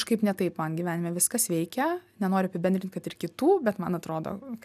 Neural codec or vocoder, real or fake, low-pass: none; real; 14.4 kHz